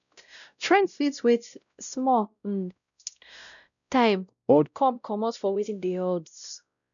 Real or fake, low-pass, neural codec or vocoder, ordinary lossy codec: fake; 7.2 kHz; codec, 16 kHz, 0.5 kbps, X-Codec, WavLM features, trained on Multilingual LibriSpeech; none